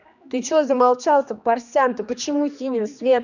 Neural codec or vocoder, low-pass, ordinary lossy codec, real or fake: codec, 16 kHz, 2 kbps, X-Codec, HuBERT features, trained on general audio; 7.2 kHz; none; fake